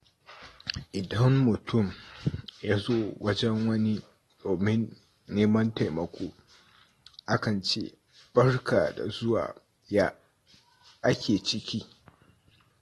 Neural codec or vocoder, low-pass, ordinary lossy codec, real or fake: none; 19.8 kHz; AAC, 32 kbps; real